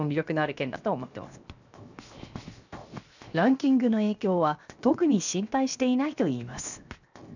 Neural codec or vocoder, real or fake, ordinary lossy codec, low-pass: codec, 16 kHz, 0.7 kbps, FocalCodec; fake; none; 7.2 kHz